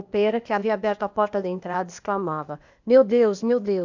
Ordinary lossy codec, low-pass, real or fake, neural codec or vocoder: none; 7.2 kHz; fake; codec, 16 kHz, 0.8 kbps, ZipCodec